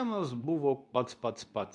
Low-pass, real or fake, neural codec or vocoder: 10.8 kHz; fake; codec, 24 kHz, 0.9 kbps, WavTokenizer, medium speech release version 2